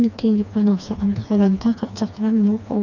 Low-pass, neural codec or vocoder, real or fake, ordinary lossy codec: 7.2 kHz; codec, 16 kHz, 2 kbps, FreqCodec, smaller model; fake; none